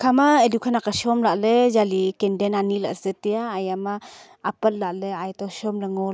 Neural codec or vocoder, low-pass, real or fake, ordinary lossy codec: none; none; real; none